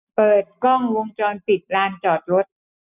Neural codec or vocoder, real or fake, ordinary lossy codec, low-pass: none; real; none; 3.6 kHz